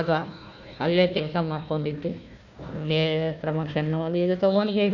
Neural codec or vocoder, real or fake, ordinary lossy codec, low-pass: codec, 16 kHz, 1 kbps, FunCodec, trained on Chinese and English, 50 frames a second; fake; none; 7.2 kHz